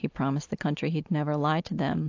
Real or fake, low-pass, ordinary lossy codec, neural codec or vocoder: real; 7.2 kHz; AAC, 48 kbps; none